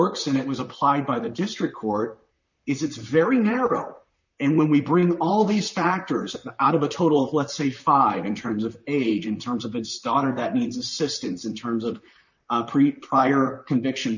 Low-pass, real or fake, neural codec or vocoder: 7.2 kHz; fake; vocoder, 44.1 kHz, 128 mel bands, Pupu-Vocoder